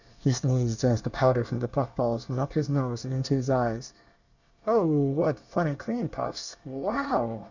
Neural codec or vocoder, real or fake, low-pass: codec, 24 kHz, 1 kbps, SNAC; fake; 7.2 kHz